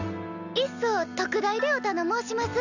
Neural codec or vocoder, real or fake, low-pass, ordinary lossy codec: none; real; 7.2 kHz; none